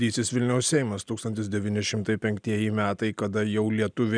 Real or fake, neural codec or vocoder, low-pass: real; none; 9.9 kHz